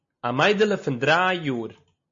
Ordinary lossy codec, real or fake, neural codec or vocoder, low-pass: MP3, 32 kbps; real; none; 7.2 kHz